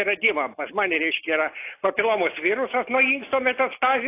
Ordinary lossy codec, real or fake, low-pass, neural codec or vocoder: AAC, 24 kbps; real; 3.6 kHz; none